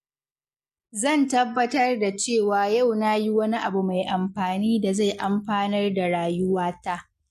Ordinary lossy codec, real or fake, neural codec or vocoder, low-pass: MP3, 64 kbps; real; none; 14.4 kHz